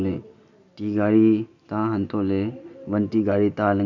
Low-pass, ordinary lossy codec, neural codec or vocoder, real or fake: 7.2 kHz; none; none; real